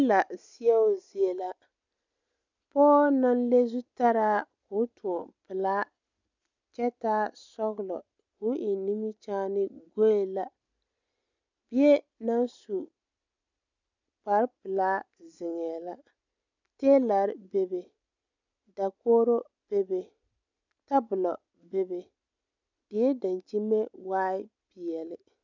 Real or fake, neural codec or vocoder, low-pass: real; none; 7.2 kHz